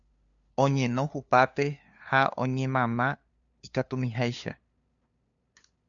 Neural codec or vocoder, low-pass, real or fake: codec, 16 kHz, 2 kbps, FunCodec, trained on LibriTTS, 25 frames a second; 7.2 kHz; fake